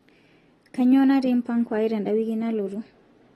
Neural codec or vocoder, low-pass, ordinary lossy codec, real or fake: none; 19.8 kHz; AAC, 32 kbps; real